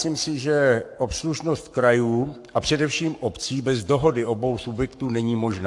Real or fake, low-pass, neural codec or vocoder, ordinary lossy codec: fake; 10.8 kHz; codec, 44.1 kHz, 7.8 kbps, Pupu-Codec; AAC, 64 kbps